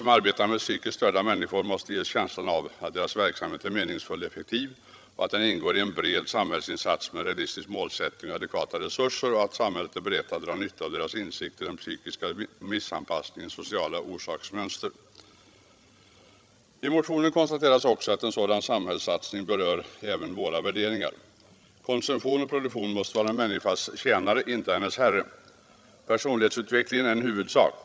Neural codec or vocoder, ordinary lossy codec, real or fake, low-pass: codec, 16 kHz, 16 kbps, FreqCodec, larger model; none; fake; none